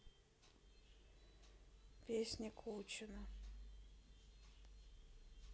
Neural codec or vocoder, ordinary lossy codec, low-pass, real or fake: none; none; none; real